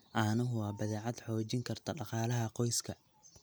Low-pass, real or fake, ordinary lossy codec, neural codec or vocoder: none; real; none; none